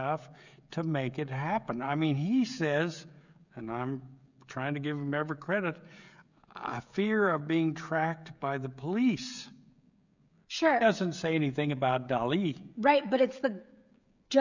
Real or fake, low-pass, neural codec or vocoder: fake; 7.2 kHz; codec, 16 kHz, 16 kbps, FreqCodec, smaller model